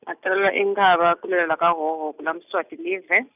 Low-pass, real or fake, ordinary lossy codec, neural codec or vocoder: 3.6 kHz; real; none; none